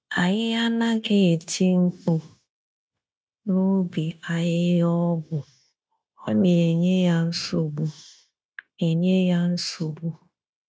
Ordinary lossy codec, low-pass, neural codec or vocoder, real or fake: none; none; codec, 16 kHz, 0.9 kbps, LongCat-Audio-Codec; fake